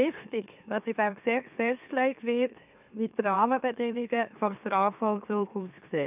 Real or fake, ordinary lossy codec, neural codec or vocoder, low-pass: fake; none; autoencoder, 44.1 kHz, a latent of 192 numbers a frame, MeloTTS; 3.6 kHz